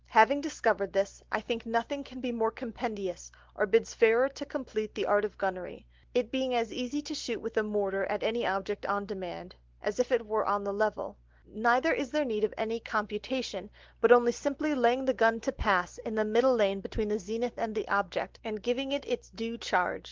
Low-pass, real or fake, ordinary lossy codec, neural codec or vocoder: 7.2 kHz; real; Opus, 16 kbps; none